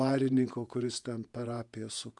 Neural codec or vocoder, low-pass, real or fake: vocoder, 48 kHz, 128 mel bands, Vocos; 10.8 kHz; fake